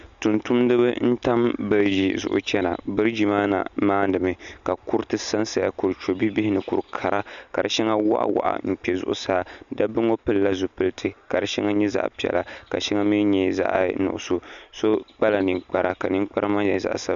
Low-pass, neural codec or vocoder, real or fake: 7.2 kHz; none; real